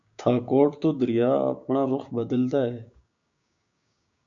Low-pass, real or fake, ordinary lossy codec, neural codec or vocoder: 7.2 kHz; fake; AAC, 64 kbps; codec, 16 kHz, 6 kbps, DAC